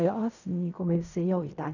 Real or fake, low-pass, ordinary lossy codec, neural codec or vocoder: fake; 7.2 kHz; none; codec, 16 kHz in and 24 kHz out, 0.4 kbps, LongCat-Audio-Codec, fine tuned four codebook decoder